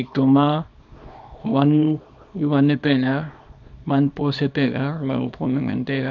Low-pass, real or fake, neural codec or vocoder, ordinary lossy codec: 7.2 kHz; fake; codec, 24 kHz, 0.9 kbps, WavTokenizer, small release; none